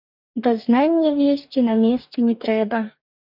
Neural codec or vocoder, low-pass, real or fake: codec, 44.1 kHz, 2.6 kbps, DAC; 5.4 kHz; fake